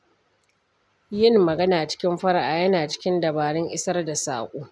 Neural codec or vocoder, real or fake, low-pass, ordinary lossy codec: none; real; none; none